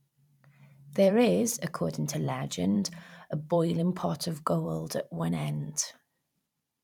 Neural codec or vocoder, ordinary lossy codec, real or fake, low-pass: vocoder, 44.1 kHz, 128 mel bands every 512 samples, BigVGAN v2; none; fake; 19.8 kHz